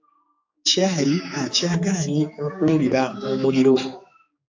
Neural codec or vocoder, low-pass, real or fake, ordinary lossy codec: codec, 16 kHz, 4 kbps, X-Codec, HuBERT features, trained on general audio; 7.2 kHz; fake; AAC, 48 kbps